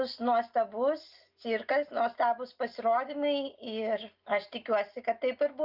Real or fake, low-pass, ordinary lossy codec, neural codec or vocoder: real; 5.4 kHz; Opus, 24 kbps; none